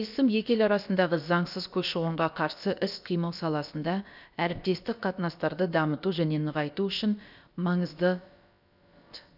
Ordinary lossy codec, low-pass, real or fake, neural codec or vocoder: none; 5.4 kHz; fake; codec, 16 kHz, about 1 kbps, DyCAST, with the encoder's durations